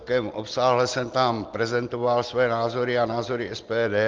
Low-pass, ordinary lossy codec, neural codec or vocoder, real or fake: 7.2 kHz; Opus, 32 kbps; none; real